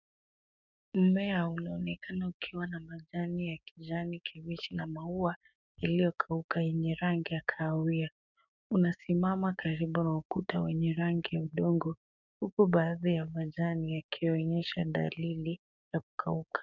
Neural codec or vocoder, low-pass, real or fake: codec, 16 kHz, 6 kbps, DAC; 7.2 kHz; fake